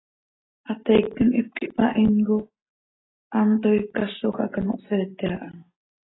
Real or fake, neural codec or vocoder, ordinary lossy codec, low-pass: real; none; AAC, 16 kbps; 7.2 kHz